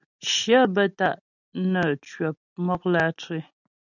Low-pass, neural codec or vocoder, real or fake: 7.2 kHz; none; real